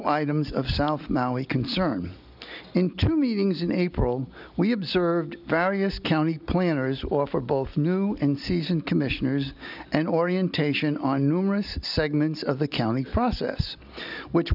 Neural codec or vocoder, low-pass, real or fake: none; 5.4 kHz; real